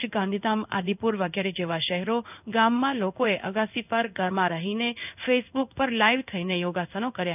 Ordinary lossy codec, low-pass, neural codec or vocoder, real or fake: none; 3.6 kHz; codec, 16 kHz in and 24 kHz out, 1 kbps, XY-Tokenizer; fake